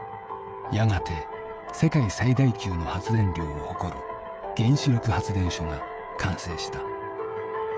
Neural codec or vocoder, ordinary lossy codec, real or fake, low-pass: codec, 16 kHz, 16 kbps, FreqCodec, smaller model; none; fake; none